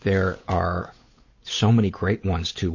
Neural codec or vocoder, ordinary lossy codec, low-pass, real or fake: codec, 16 kHz, 8 kbps, FunCodec, trained on Chinese and English, 25 frames a second; MP3, 32 kbps; 7.2 kHz; fake